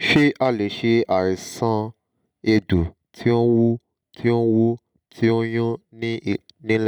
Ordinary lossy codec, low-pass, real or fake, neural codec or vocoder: none; 19.8 kHz; real; none